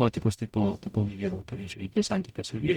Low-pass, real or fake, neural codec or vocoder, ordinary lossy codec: 19.8 kHz; fake; codec, 44.1 kHz, 0.9 kbps, DAC; Opus, 64 kbps